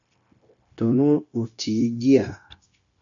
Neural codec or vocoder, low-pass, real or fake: codec, 16 kHz, 0.9 kbps, LongCat-Audio-Codec; 7.2 kHz; fake